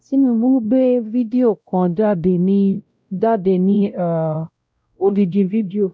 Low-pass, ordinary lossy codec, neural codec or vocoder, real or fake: none; none; codec, 16 kHz, 0.5 kbps, X-Codec, WavLM features, trained on Multilingual LibriSpeech; fake